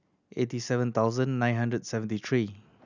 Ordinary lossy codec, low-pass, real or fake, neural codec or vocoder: none; 7.2 kHz; real; none